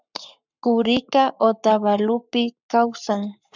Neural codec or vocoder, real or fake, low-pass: codec, 44.1 kHz, 7.8 kbps, Pupu-Codec; fake; 7.2 kHz